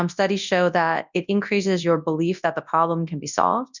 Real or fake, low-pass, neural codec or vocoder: fake; 7.2 kHz; codec, 24 kHz, 0.9 kbps, WavTokenizer, large speech release